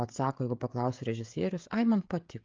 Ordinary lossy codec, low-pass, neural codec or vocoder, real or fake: Opus, 24 kbps; 7.2 kHz; codec, 16 kHz, 16 kbps, FreqCodec, smaller model; fake